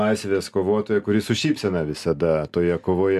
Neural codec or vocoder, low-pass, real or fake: none; 14.4 kHz; real